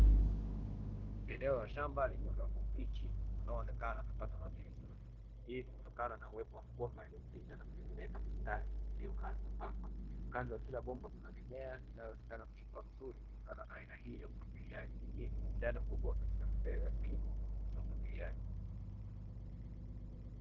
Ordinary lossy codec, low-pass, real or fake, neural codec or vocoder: Opus, 16 kbps; 7.2 kHz; fake; codec, 16 kHz, 0.9 kbps, LongCat-Audio-Codec